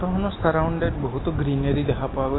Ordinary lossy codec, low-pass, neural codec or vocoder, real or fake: AAC, 16 kbps; 7.2 kHz; none; real